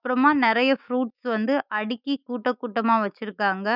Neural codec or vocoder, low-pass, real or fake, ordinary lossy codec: autoencoder, 48 kHz, 128 numbers a frame, DAC-VAE, trained on Japanese speech; 5.4 kHz; fake; none